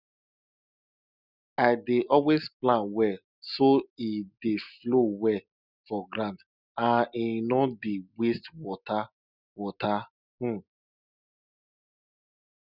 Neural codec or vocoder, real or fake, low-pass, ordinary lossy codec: none; real; 5.4 kHz; none